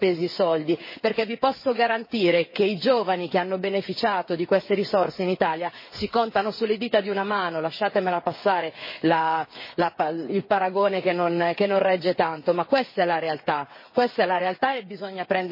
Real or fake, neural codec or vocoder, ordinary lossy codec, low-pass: fake; codec, 16 kHz, 8 kbps, FreqCodec, smaller model; MP3, 24 kbps; 5.4 kHz